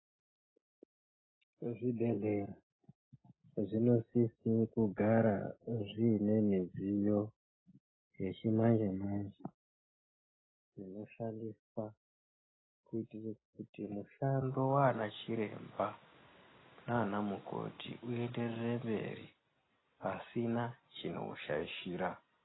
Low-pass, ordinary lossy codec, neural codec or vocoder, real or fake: 7.2 kHz; AAC, 16 kbps; none; real